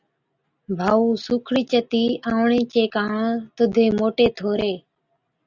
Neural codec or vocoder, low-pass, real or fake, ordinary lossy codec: none; 7.2 kHz; real; Opus, 64 kbps